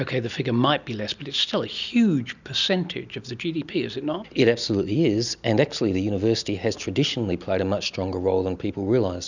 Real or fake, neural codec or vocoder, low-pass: real; none; 7.2 kHz